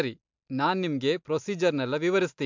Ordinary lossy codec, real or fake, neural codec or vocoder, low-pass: MP3, 64 kbps; real; none; 7.2 kHz